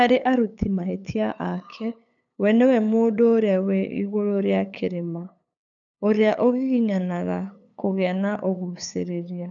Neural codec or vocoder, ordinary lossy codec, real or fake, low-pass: codec, 16 kHz, 8 kbps, FunCodec, trained on LibriTTS, 25 frames a second; none; fake; 7.2 kHz